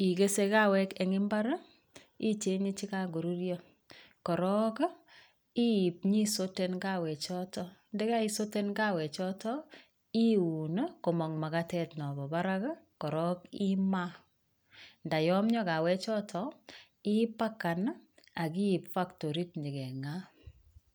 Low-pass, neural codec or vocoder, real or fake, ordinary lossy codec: none; none; real; none